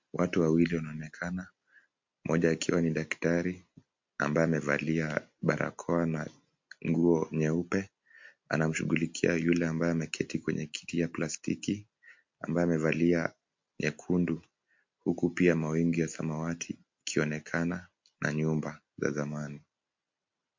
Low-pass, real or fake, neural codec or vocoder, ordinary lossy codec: 7.2 kHz; real; none; MP3, 48 kbps